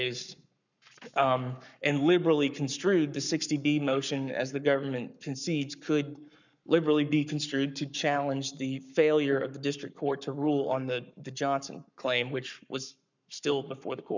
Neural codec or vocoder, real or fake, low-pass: codec, 44.1 kHz, 7.8 kbps, Pupu-Codec; fake; 7.2 kHz